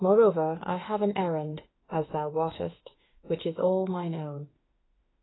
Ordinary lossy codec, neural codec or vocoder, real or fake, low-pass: AAC, 16 kbps; autoencoder, 48 kHz, 32 numbers a frame, DAC-VAE, trained on Japanese speech; fake; 7.2 kHz